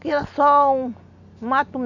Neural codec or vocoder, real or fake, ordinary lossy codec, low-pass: none; real; none; 7.2 kHz